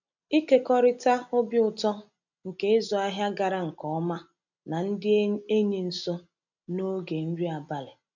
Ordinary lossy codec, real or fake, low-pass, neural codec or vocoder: none; real; 7.2 kHz; none